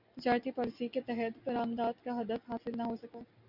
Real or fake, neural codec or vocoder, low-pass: real; none; 5.4 kHz